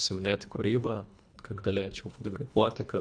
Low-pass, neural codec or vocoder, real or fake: 9.9 kHz; codec, 24 kHz, 1.5 kbps, HILCodec; fake